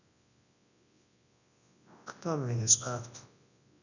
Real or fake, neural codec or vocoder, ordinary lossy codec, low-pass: fake; codec, 24 kHz, 0.9 kbps, WavTokenizer, large speech release; none; 7.2 kHz